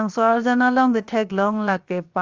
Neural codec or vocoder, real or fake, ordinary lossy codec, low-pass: codec, 16 kHz, 0.7 kbps, FocalCodec; fake; Opus, 32 kbps; 7.2 kHz